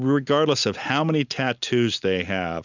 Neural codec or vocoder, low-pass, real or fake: none; 7.2 kHz; real